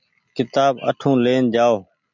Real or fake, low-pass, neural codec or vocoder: real; 7.2 kHz; none